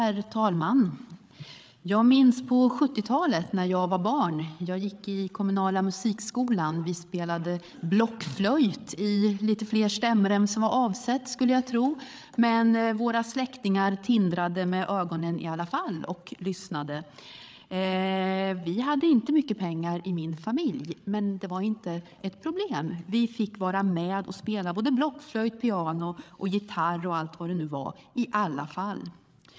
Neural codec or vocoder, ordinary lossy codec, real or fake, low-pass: codec, 16 kHz, 8 kbps, FreqCodec, larger model; none; fake; none